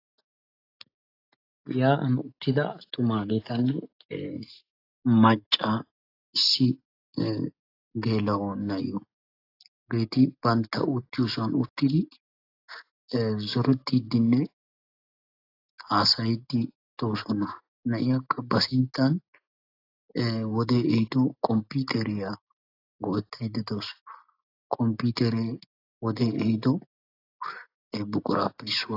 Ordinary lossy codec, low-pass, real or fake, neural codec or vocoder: AAC, 32 kbps; 5.4 kHz; real; none